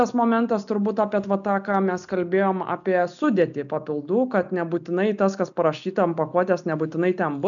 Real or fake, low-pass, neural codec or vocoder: real; 7.2 kHz; none